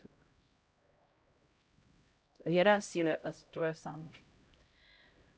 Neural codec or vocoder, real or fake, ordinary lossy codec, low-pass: codec, 16 kHz, 0.5 kbps, X-Codec, HuBERT features, trained on LibriSpeech; fake; none; none